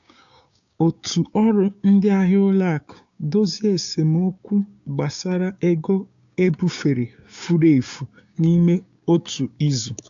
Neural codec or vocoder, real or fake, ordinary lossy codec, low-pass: codec, 16 kHz, 6 kbps, DAC; fake; AAC, 64 kbps; 7.2 kHz